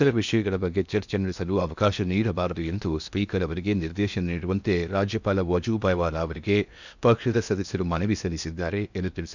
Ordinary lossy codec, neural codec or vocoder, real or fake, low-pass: none; codec, 16 kHz in and 24 kHz out, 0.8 kbps, FocalCodec, streaming, 65536 codes; fake; 7.2 kHz